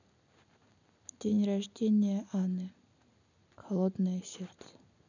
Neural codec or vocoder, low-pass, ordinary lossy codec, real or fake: none; 7.2 kHz; none; real